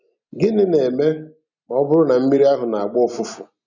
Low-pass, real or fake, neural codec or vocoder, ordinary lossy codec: 7.2 kHz; real; none; none